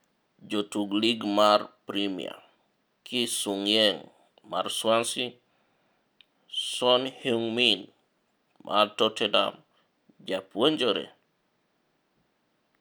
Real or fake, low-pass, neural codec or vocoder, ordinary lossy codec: real; none; none; none